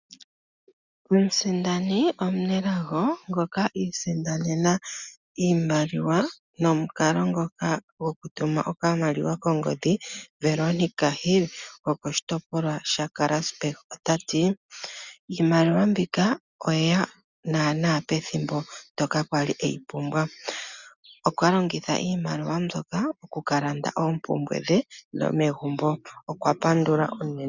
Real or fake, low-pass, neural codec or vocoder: real; 7.2 kHz; none